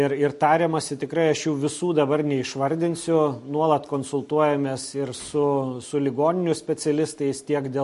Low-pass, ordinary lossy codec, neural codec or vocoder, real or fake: 14.4 kHz; MP3, 48 kbps; none; real